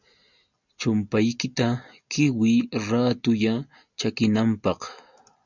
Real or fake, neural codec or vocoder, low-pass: real; none; 7.2 kHz